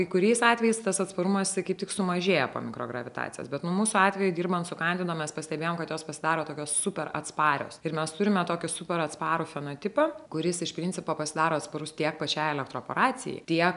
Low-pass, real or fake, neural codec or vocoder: 10.8 kHz; real; none